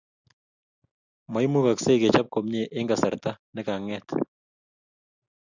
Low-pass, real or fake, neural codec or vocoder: 7.2 kHz; real; none